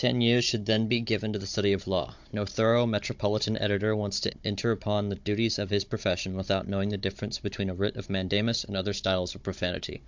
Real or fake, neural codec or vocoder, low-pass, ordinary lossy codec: fake; codec, 16 kHz, 16 kbps, FunCodec, trained on Chinese and English, 50 frames a second; 7.2 kHz; MP3, 64 kbps